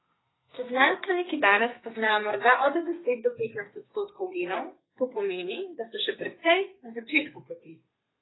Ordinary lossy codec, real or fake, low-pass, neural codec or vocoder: AAC, 16 kbps; fake; 7.2 kHz; codec, 32 kHz, 1.9 kbps, SNAC